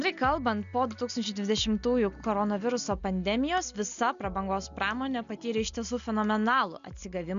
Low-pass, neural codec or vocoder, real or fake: 7.2 kHz; none; real